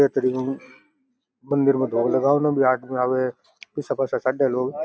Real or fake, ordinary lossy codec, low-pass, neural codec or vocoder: real; none; none; none